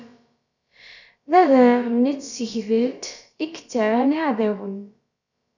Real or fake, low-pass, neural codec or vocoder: fake; 7.2 kHz; codec, 16 kHz, about 1 kbps, DyCAST, with the encoder's durations